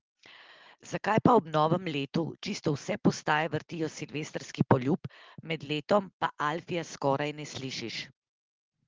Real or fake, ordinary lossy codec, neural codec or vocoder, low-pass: real; Opus, 16 kbps; none; 7.2 kHz